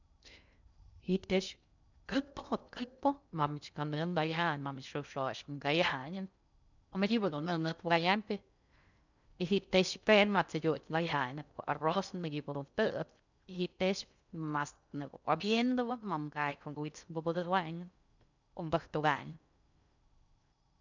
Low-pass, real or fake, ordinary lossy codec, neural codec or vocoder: 7.2 kHz; fake; none; codec, 16 kHz in and 24 kHz out, 0.6 kbps, FocalCodec, streaming, 2048 codes